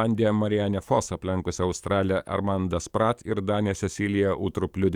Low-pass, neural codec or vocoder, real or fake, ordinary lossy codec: 19.8 kHz; codec, 44.1 kHz, 7.8 kbps, DAC; fake; Opus, 64 kbps